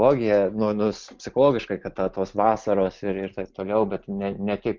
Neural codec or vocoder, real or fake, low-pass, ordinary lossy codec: none; real; 7.2 kHz; Opus, 24 kbps